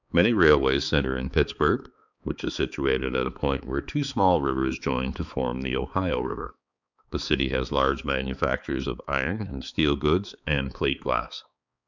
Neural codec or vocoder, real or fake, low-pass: codec, 16 kHz, 4 kbps, X-Codec, HuBERT features, trained on balanced general audio; fake; 7.2 kHz